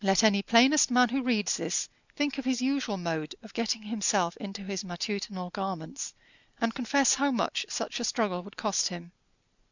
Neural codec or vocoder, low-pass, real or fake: none; 7.2 kHz; real